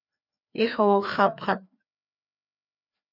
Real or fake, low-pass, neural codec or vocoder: fake; 5.4 kHz; codec, 16 kHz, 2 kbps, FreqCodec, larger model